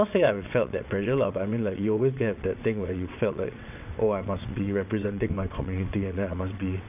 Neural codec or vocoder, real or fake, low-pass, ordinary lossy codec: vocoder, 22.05 kHz, 80 mel bands, WaveNeXt; fake; 3.6 kHz; none